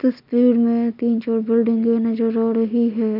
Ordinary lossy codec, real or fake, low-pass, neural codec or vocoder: none; real; 5.4 kHz; none